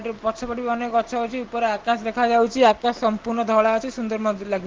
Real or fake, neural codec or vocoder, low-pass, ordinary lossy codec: real; none; 7.2 kHz; Opus, 16 kbps